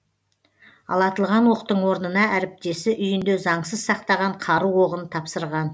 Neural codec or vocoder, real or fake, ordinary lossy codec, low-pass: none; real; none; none